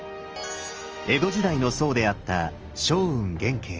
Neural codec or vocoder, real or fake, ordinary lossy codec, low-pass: none; real; Opus, 24 kbps; 7.2 kHz